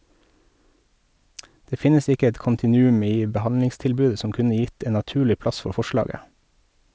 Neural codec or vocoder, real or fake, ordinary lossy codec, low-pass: none; real; none; none